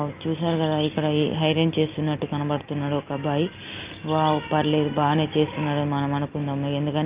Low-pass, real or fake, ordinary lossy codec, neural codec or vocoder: 3.6 kHz; real; Opus, 24 kbps; none